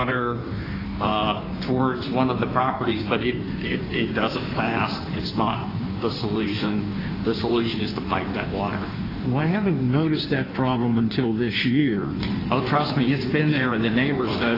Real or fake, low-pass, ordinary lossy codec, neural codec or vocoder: fake; 5.4 kHz; AAC, 24 kbps; codec, 16 kHz in and 24 kHz out, 1.1 kbps, FireRedTTS-2 codec